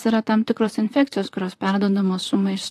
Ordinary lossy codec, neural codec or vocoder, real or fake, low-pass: AAC, 64 kbps; vocoder, 44.1 kHz, 128 mel bands, Pupu-Vocoder; fake; 14.4 kHz